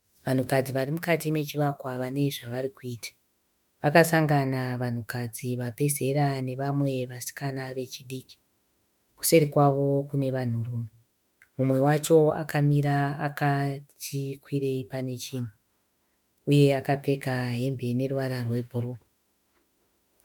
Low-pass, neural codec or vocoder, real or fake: 19.8 kHz; autoencoder, 48 kHz, 32 numbers a frame, DAC-VAE, trained on Japanese speech; fake